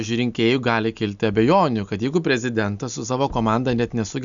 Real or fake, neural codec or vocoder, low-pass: real; none; 7.2 kHz